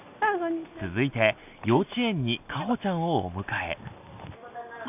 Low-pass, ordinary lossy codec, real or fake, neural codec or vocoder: 3.6 kHz; none; real; none